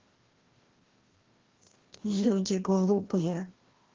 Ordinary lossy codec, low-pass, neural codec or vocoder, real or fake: Opus, 16 kbps; 7.2 kHz; codec, 16 kHz, 1 kbps, FreqCodec, larger model; fake